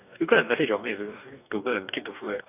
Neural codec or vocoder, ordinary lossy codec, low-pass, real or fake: codec, 44.1 kHz, 2.6 kbps, DAC; none; 3.6 kHz; fake